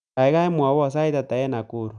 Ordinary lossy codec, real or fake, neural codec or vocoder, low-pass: none; real; none; 9.9 kHz